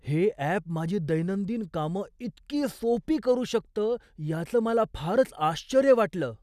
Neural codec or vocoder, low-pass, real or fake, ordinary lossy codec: none; 14.4 kHz; real; none